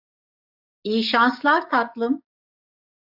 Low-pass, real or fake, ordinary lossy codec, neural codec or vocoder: 5.4 kHz; fake; AAC, 48 kbps; codec, 44.1 kHz, 7.8 kbps, DAC